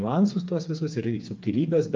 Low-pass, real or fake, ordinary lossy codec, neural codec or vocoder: 7.2 kHz; fake; Opus, 24 kbps; codec, 16 kHz, 4 kbps, FreqCodec, smaller model